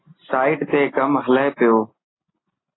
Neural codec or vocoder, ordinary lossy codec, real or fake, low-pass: none; AAC, 16 kbps; real; 7.2 kHz